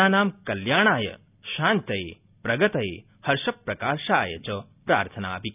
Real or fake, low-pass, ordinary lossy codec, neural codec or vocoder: real; 3.6 kHz; none; none